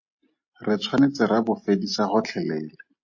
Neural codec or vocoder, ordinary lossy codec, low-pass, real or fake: none; MP3, 32 kbps; 7.2 kHz; real